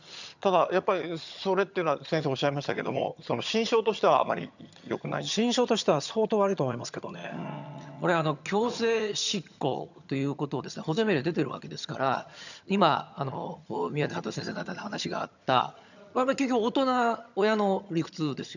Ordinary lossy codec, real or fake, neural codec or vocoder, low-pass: none; fake; vocoder, 22.05 kHz, 80 mel bands, HiFi-GAN; 7.2 kHz